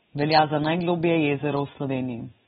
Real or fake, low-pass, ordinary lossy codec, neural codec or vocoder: real; 10.8 kHz; AAC, 16 kbps; none